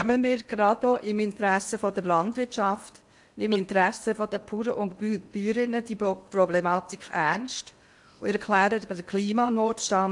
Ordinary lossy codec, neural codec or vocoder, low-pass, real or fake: AAC, 64 kbps; codec, 16 kHz in and 24 kHz out, 0.8 kbps, FocalCodec, streaming, 65536 codes; 10.8 kHz; fake